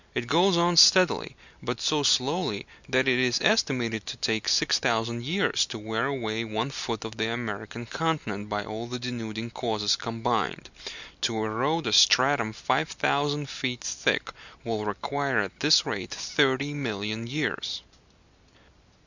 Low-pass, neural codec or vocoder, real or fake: 7.2 kHz; none; real